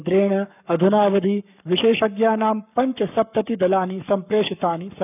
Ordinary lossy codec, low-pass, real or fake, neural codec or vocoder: none; 3.6 kHz; fake; codec, 44.1 kHz, 7.8 kbps, Pupu-Codec